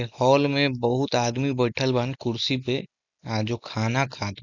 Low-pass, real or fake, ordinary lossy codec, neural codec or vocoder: 7.2 kHz; real; none; none